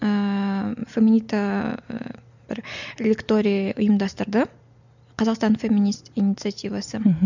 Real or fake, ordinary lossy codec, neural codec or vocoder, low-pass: real; none; none; 7.2 kHz